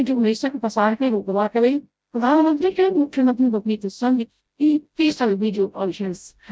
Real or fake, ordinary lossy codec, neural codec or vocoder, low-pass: fake; none; codec, 16 kHz, 0.5 kbps, FreqCodec, smaller model; none